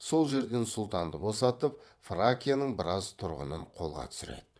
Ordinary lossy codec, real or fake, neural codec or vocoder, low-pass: none; fake; vocoder, 22.05 kHz, 80 mel bands, WaveNeXt; none